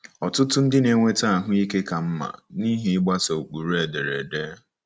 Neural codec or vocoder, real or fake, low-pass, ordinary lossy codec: none; real; none; none